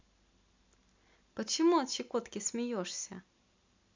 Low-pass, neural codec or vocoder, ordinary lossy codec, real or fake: 7.2 kHz; none; MP3, 64 kbps; real